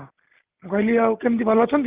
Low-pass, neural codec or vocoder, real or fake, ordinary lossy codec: 3.6 kHz; none; real; Opus, 32 kbps